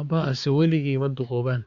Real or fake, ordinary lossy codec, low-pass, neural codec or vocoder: fake; Opus, 64 kbps; 7.2 kHz; codec, 16 kHz, 4 kbps, X-Codec, HuBERT features, trained on balanced general audio